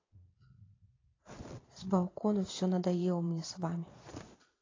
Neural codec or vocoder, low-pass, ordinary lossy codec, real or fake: vocoder, 22.05 kHz, 80 mel bands, Vocos; 7.2 kHz; AAC, 32 kbps; fake